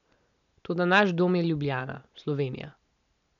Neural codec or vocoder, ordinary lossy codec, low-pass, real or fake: none; MP3, 64 kbps; 7.2 kHz; real